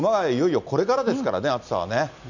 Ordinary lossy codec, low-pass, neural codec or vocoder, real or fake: none; 7.2 kHz; none; real